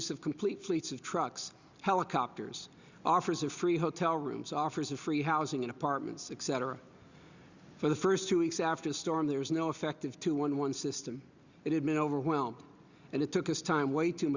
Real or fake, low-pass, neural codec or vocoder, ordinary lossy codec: real; 7.2 kHz; none; Opus, 64 kbps